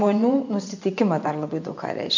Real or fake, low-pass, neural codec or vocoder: fake; 7.2 kHz; vocoder, 24 kHz, 100 mel bands, Vocos